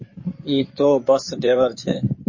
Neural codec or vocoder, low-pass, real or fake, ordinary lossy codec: codec, 16 kHz in and 24 kHz out, 2.2 kbps, FireRedTTS-2 codec; 7.2 kHz; fake; MP3, 32 kbps